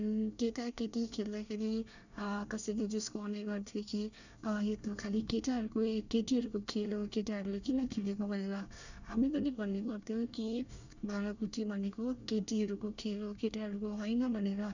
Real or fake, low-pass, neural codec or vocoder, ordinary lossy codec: fake; 7.2 kHz; codec, 24 kHz, 1 kbps, SNAC; none